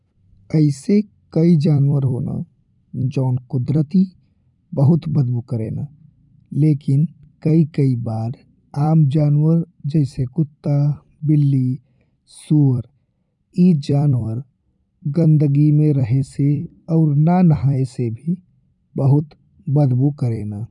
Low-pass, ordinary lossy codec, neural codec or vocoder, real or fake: 10.8 kHz; none; vocoder, 44.1 kHz, 128 mel bands every 256 samples, BigVGAN v2; fake